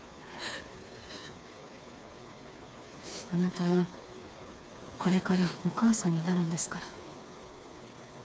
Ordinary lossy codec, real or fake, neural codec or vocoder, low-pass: none; fake; codec, 16 kHz, 4 kbps, FreqCodec, smaller model; none